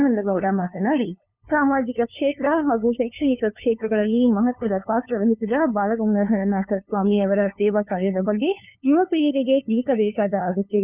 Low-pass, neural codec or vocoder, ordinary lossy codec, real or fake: 3.6 kHz; codec, 16 kHz, 2 kbps, FunCodec, trained on LibriTTS, 25 frames a second; none; fake